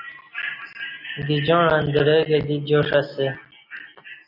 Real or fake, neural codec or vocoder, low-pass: real; none; 5.4 kHz